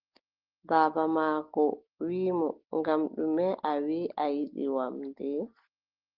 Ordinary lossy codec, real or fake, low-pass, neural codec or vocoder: Opus, 16 kbps; real; 5.4 kHz; none